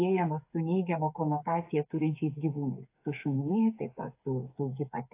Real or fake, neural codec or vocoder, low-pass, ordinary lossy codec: fake; codec, 16 kHz, 8 kbps, FreqCodec, smaller model; 3.6 kHz; AAC, 24 kbps